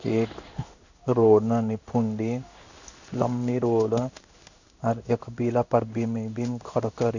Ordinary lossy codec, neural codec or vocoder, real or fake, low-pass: none; codec, 16 kHz in and 24 kHz out, 1 kbps, XY-Tokenizer; fake; 7.2 kHz